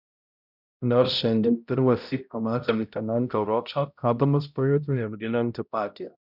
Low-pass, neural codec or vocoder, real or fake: 5.4 kHz; codec, 16 kHz, 0.5 kbps, X-Codec, HuBERT features, trained on balanced general audio; fake